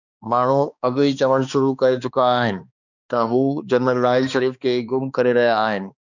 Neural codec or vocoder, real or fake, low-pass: codec, 16 kHz, 2 kbps, X-Codec, HuBERT features, trained on balanced general audio; fake; 7.2 kHz